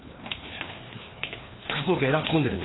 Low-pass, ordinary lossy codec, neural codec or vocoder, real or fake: 7.2 kHz; AAC, 16 kbps; codec, 16 kHz, 2 kbps, FreqCodec, larger model; fake